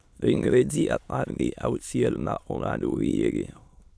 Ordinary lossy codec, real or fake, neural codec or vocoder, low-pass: none; fake; autoencoder, 22.05 kHz, a latent of 192 numbers a frame, VITS, trained on many speakers; none